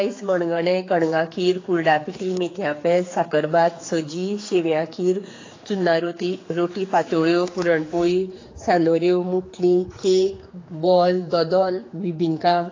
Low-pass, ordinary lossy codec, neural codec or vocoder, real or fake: 7.2 kHz; AAC, 32 kbps; codec, 16 kHz, 4 kbps, X-Codec, HuBERT features, trained on general audio; fake